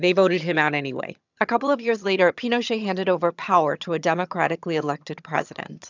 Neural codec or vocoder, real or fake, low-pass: vocoder, 22.05 kHz, 80 mel bands, HiFi-GAN; fake; 7.2 kHz